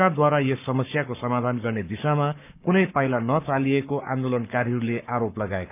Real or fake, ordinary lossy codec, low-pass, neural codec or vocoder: fake; none; 3.6 kHz; codec, 44.1 kHz, 7.8 kbps, Pupu-Codec